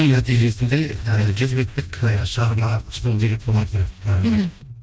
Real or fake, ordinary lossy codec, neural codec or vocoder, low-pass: fake; none; codec, 16 kHz, 1 kbps, FreqCodec, smaller model; none